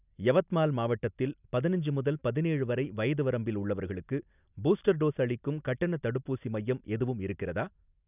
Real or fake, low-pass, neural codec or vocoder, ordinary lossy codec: real; 3.6 kHz; none; none